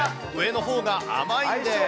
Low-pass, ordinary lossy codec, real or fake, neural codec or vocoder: none; none; real; none